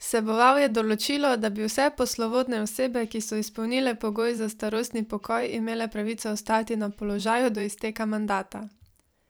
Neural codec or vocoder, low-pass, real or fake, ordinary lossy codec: vocoder, 44.1 kHz, 128 mel bands every 512 samples, BigVGAN v2; none; fake; none